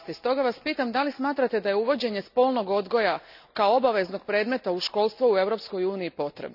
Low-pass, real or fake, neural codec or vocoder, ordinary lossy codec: 5.4 kHz; real; none; none